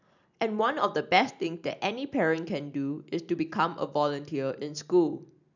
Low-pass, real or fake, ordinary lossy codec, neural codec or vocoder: 7.2 kHz; real; none; none